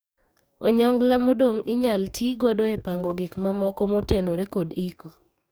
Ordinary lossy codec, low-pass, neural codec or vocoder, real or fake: none; none; codec, 44.1 kHz, 2.6 kbps, DAC; fake